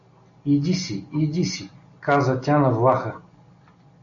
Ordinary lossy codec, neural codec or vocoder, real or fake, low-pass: AAC, 64 kbps; none; real; 7.2 kHz